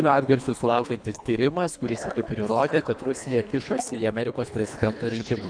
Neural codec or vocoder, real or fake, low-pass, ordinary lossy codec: codec, 24 kHz, 1.5 kbps, HILCodec; fake; 9.9 kHz; MP3, 96 kbps